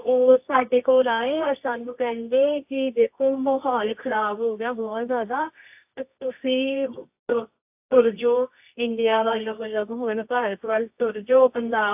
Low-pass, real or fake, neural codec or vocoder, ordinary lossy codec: 3.6 kHz; fake; codec, 24 kHz, 0.9 kbps, WavTokenizer, medium music audio release; AAC, 32 kbps